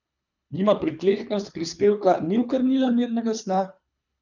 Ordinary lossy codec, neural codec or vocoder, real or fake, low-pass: none; codec, 24 kHz, 3 kbps, HILCodec; fake; 7.2 kHz